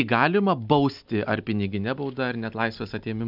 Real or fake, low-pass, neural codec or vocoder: real; 5.4 kHz; none